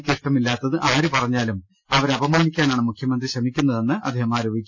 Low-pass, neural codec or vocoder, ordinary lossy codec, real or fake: 7.2 kHz; none; none; real